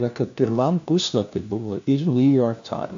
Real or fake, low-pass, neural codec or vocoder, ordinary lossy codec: fake; 7.2 kHz; codec, 16 kHz, 1 kbps, FunCodec, trained on LibriTTS, 50 frames a second; AAC, 64 kbps